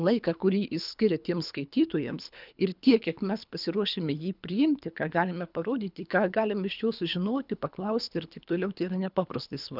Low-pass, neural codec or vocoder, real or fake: 5.4 kHz; codec, 24 kHz, 3 kbps, HILCodec; fake